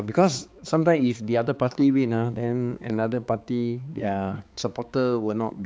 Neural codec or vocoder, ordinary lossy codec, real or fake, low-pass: codec, 16 kHz, 4 kbps, X-Codec, HuBERT features, trained on balanced general audio; none; fake; none